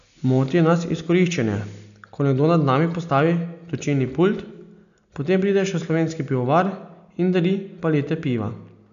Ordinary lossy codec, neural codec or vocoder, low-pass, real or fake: none; none; 7.2 kHz; real